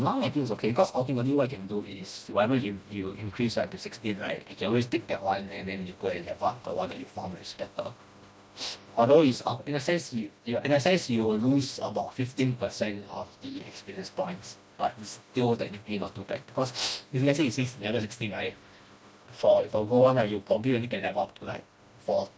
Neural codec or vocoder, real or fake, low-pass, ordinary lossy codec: codec, 16 kHz, 1 kbps, FreqCodec, smaller model; fake; none; none